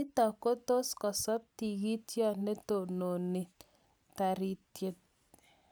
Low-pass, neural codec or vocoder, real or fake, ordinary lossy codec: none; none; real; none